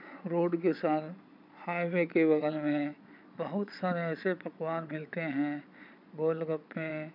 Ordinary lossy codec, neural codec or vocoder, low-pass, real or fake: none; vocoder, 22.05 kHz, 80 mel bands, Vocos; 5.4 kHz; fake